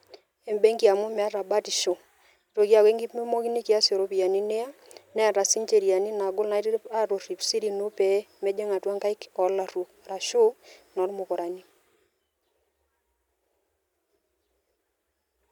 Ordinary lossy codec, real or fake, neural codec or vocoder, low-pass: none; real; none; 19.8 kHz